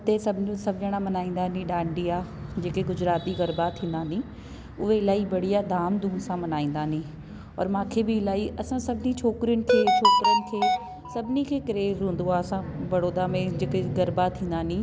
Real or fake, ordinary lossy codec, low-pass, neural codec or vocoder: real; none; none; none